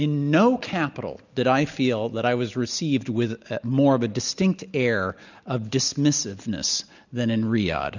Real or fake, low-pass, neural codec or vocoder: real; 7.2 kHz; none